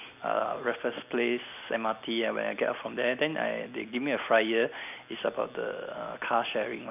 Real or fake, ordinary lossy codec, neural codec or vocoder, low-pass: real; none; none; 3.6 kHz